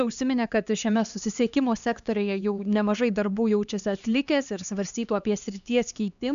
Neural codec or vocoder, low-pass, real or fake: codec, 16 kHz, 2 kbps, X-Codec, HuBERT features, trained on LibriSpeech; 7.2 kHz; fake